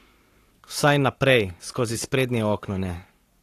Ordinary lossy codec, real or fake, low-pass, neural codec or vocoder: AAC, 48 kbps; fake; 14.4 kHz; codec, 44.1 kHz, 7.8 kbps, Pupu-Codec